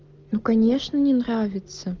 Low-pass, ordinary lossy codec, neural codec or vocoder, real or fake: 7.2 kHz; Opus, 16 kbps; none; real